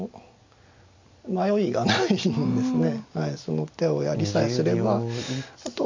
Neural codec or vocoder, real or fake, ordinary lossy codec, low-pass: none; real; MP3, 64 kbps; 7.2 kHz